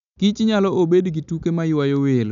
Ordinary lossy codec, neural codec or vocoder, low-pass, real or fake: MP3, 96 kbps; none; 7.2 kHz; real